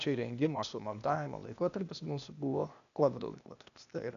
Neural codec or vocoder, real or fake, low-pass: codec, 16 kHz, 0.8 kbps, ZipCodec; fake; 7.2 kHz